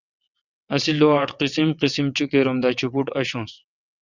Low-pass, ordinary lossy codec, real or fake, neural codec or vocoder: 7.2 kHz; Opus, 64 kbps; fake; vocoder, 22.05 kHz, 80 mel bands, WaveNeXt